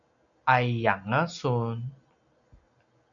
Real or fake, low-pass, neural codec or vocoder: real; 7.2 kHz; none